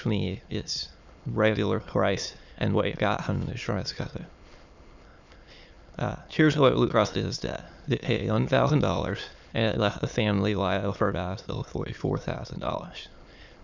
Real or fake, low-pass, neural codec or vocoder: fake; 7.2 kHz; autoencoder, 22.05 kHz, a latent of 192 numbers a frame, VITS, trained on many speakers